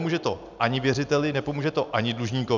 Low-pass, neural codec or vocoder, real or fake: 7.2 kHz; none; real